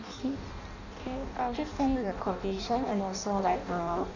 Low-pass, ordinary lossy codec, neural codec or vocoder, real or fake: 7.2 kHz; none; codec, 16 kHz in and 24 kHz out, 0.6 kbps, FireRedTTS-2 codec; fake